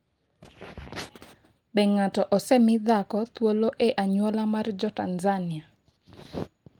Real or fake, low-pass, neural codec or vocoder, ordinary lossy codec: real; 19.8 kHz; none; Opus, 32 kbps